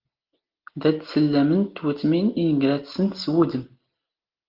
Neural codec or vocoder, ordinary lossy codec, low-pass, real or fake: none; Opus, 32 kbps; 5.4 kHz; real